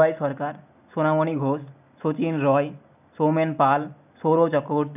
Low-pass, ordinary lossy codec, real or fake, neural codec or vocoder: 3.6 kHz; none; real; none